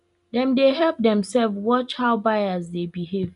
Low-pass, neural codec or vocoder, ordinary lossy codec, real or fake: 10.8 kHz; none; none; real